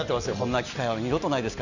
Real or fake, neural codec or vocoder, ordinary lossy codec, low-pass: real; none; none; 7.2 kHz